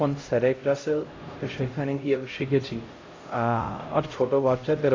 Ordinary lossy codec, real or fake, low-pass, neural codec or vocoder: AAC, 32 kbps; fake; 7.2 kHz; codec, 16 kHz, 0.5 kbps, X-Codec, HuBERT features, trained on LibriSpeech